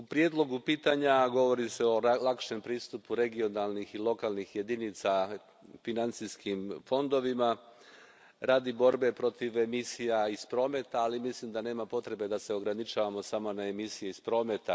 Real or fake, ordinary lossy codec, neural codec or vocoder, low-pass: real; none; none; none